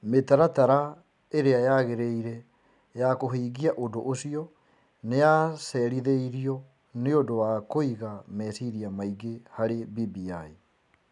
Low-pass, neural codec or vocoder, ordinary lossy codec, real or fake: 10.8 kHz; none; none; real